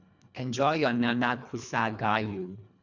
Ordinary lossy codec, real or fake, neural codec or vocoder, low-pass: none; fake; codec, 24 kHz, 1.5 kbps, HILCodec; 7.2 kHz